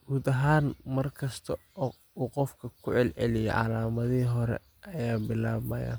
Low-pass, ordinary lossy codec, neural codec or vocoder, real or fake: none; none; none; real